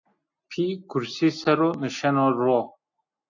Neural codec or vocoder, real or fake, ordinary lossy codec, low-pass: none; real; AAC, 48 kbps; 7.2 kHz